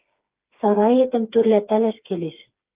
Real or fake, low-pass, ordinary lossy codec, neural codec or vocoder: fake; 3.6 kHz; Opus, 24 kbps; codec, 16 kHz, 4 kbps, FreqCodec, smaller model